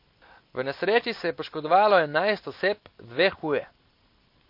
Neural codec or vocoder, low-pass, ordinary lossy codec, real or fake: none; 5.4 kHz; MP3, 32 kbps; real